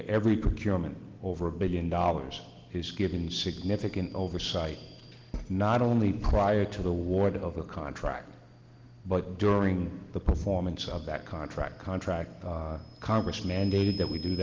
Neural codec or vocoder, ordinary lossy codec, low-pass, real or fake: none; Opus, 16 kbps; 7.2 kHz; real